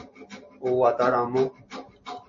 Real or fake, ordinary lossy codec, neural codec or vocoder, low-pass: real; MP3, 32 kbps; none; 7.2 kHz